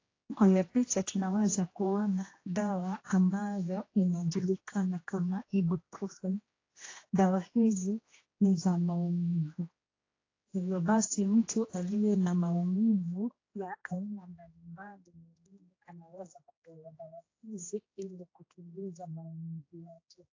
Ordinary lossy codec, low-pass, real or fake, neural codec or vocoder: AAC, 32 kbps; 7.2 kHz; fake; codec, 16 kHz, 1 kbps, X-Codec, HuBERT features, trained on general audio